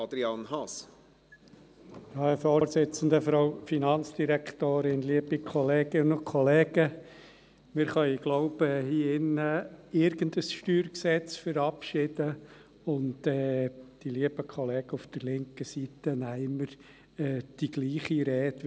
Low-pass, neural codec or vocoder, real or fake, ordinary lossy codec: none; none; real; none